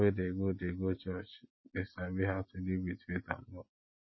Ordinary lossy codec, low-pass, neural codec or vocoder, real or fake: MP3, 24 kbps; 7.2 kHz; none; real